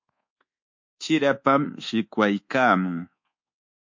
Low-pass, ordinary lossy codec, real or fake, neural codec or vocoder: 7.2 kHz; MP3, 48 kbps; fake; codec, 24 kHz, 1.2 kbps, DualCodec